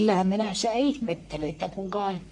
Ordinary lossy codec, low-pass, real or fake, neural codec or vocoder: AAC, 64 kbps; 10.8 kHz; fake; codec, 44.1 kHz, 1.7 kbps, Pupu-Codec